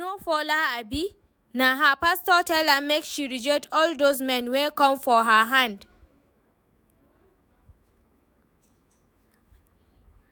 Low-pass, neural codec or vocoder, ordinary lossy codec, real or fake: none; autoencoder, 48 kHz, 128 numbers a frame, DAC-VAE, trained on Japanese speech; none; fake